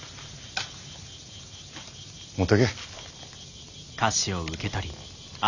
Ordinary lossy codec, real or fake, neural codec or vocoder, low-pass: none; real; none; 7.2 kHz